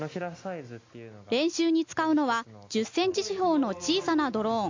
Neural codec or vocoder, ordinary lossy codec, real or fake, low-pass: none; MP3, 48 kbps; real; 7.2 kHz